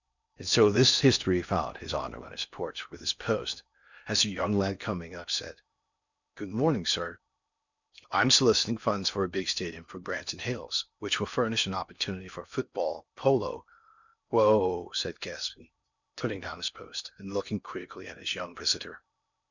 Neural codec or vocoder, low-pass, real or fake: codec, 16 kHz in and 24 kHz out, 0.6 kbps, FocalCodec, streaming, 4096 codes; 7.2 kHz; fake